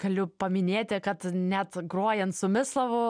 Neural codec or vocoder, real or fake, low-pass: none; real; 9.9 kHz